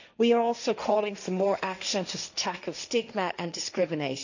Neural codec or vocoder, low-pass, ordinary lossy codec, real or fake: codec, 16 kHz, 1.1 kbps, Voila-Tokenizer; 7.2 kHz; none; fake